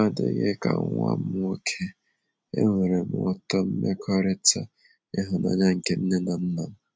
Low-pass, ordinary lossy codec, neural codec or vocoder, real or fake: none; none; none; real